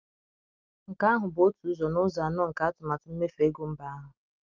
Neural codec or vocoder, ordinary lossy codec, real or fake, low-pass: none; Opus, 24 kbps; real; 7.2 kHz